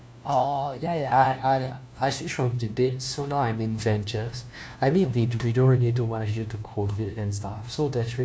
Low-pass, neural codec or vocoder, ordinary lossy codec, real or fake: none; codec, 16 kHz, 1 kbps, FunCodec, trained on LibriTTS, 50 frames a second; none; fake